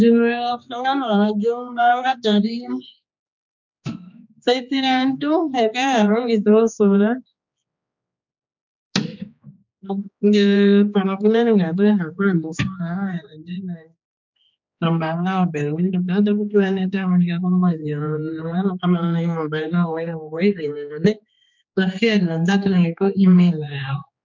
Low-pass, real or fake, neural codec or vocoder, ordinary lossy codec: 7.2 kHz; fake; codec, 16 kHz, 2 kbps, X-Codec, HuBERT features, trained on general audio; MP3, 64 kbps